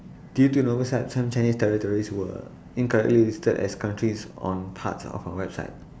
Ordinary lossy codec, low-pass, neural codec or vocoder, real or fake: none; none; none; real